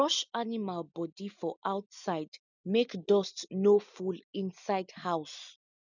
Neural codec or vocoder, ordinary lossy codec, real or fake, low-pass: none; none; real; 7.2 kHz